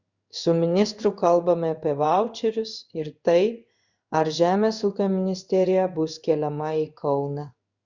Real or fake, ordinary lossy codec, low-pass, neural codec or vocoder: fake; Opus, 64 kbps; 7.2 kHz; codec, 16 kHz in and 24 kHz out, 1 kbps, XY-Tokenizer